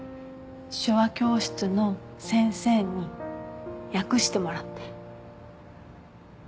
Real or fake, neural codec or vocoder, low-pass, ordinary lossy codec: real; none; none; none